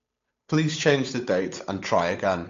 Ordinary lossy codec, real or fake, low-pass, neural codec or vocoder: AAC, 48 kbps; fake; 7.2 kHz; codec, 16 kHz, 8 kbps, FunCodec, trained on Chinese and English, 25 frames a second